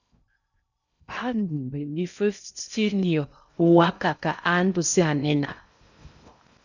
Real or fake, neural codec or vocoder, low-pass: fake; codec, 16 kHz in and 24 kHz out, 0.6 kbps, FocalCodec, streaming, 2048 codes; 7.2 kHz